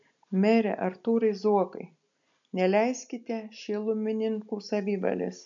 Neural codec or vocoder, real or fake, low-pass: none; real; 7.2 kHz